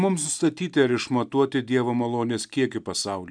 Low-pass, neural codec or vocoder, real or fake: 9.9 kHz; none; real